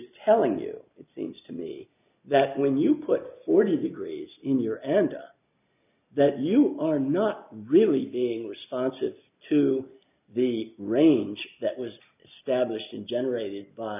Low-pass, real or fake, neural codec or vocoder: 3.6 kHz; real; none